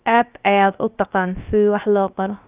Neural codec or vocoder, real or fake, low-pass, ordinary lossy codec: codec, 16 kHz, about 1 kbps, DyCAST, with the encoder's durations; fake; 3.6 kHz; Opus, 24 kbps